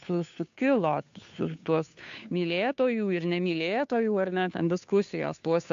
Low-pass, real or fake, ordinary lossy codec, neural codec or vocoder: 7.2 kHz; fake; AAC, 64 kbps; codec, 16 kHz, 2 kbps, FunCodec, trained on Chinese and English, 25 frames a second